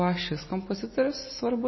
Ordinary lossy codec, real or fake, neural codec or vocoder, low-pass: MP3, 24 kbps; real; none; 7.2 kHz